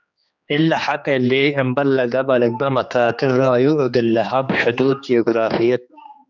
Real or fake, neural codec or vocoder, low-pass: fake; codec, 16 kHz, 2 kbps, X-Codec, HuBERT features, trained on general audio; 7.2 kHz